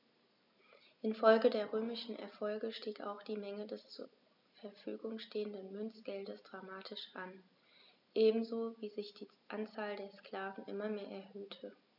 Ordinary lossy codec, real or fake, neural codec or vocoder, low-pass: none; real; none; 5.4 kHz